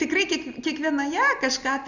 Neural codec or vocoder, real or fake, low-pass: none; real; 7.2 kHz